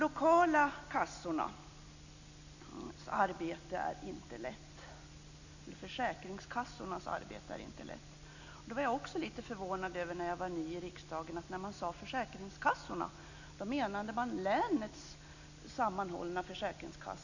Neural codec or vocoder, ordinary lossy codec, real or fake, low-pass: none; none; real; 7.2 kHz